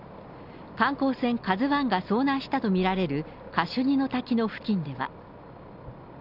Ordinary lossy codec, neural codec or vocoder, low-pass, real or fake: none; none; 5.4 kHz; real